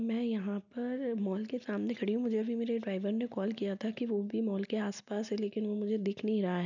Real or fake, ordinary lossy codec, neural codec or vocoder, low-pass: real; none; none; 7.2 kHz